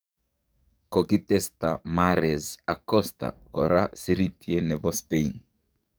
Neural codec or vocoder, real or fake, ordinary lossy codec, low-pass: codec, 44.1 kHz, 7.8 kbps, DAC; fake; none; none